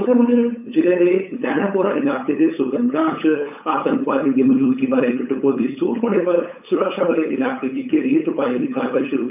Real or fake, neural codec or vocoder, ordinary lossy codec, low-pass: fake; codec, 16 kHz, 8 kbps, FunCodec, trained on LibriTTS, 25 frames a second; none; 3.6 kHz